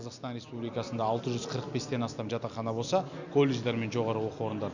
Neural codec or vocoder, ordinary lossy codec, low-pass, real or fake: none; none; 7.2 kHz; real